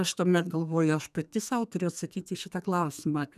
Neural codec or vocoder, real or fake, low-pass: codec, 32 kHz, 1.9 kbps, SNAC; fake; 14.4 kHz